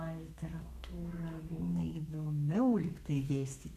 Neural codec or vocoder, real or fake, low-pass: codec, 32 kHz, 1.9 kbps, SNAC; fake; 14.4 kHz